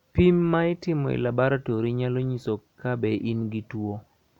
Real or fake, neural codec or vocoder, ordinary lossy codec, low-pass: real; none; none; 19.8 kHz